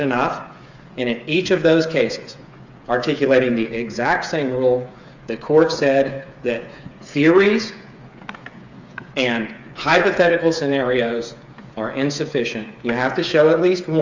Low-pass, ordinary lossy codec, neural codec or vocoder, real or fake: 7.2 kHz; Opus, 64 kbps; codec, 16 kHz, 8 kbps, FreqCodec, smaller model; fake